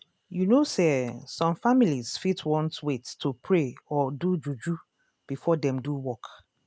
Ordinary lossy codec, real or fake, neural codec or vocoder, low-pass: none; real; none; none